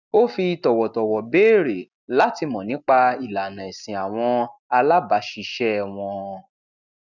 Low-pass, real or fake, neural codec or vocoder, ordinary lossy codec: 7.2 kHz; real; none; none